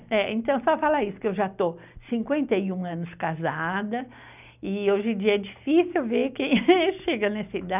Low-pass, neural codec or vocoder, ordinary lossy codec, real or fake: 3.6 kHz; none; none; real